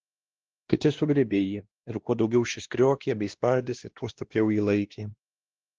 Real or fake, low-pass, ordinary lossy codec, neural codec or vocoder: fake; 7.2 kHz; Opus, 16 kbps; codec, 16 kHz, 1 kbps, X-Codec, WavLM features, trained on Multilingual LibriSpeech